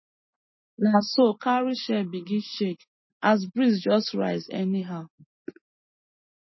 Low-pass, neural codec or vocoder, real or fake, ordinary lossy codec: 7.2 kHz; none; real; MP3, 24 kbps